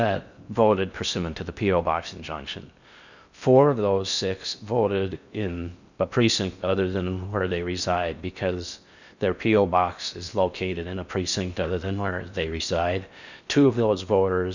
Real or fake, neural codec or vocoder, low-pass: fake; codec, 16 kHz in and 24 kHz out, 0.6 kbps, FocalCodec, streaming, 4096 codes; 7.2 kHz